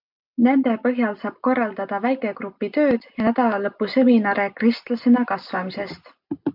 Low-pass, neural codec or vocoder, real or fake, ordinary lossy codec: 5.4 kHz; none; real; MP3, 48 kbps